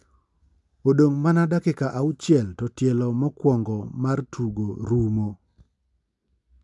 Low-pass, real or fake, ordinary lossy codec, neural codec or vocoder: 10.8 kHz; real; MP3, 96 kbps; none